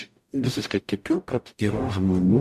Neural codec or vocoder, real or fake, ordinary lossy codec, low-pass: codec, 44.1 kHz, 0.9 kbps, DAC; fake; MP3, 96 kbps; 14.4 kHz